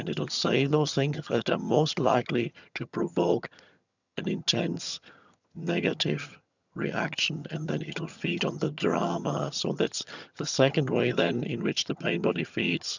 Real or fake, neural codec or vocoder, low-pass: fake; vocoder, 22.05 kHz, 80 mel bands, HiFi-GAN; 7.2 kHz